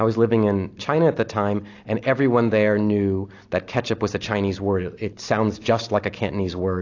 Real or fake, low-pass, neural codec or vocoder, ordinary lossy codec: real; 7.2 kHz; none; AAC, 48 kbps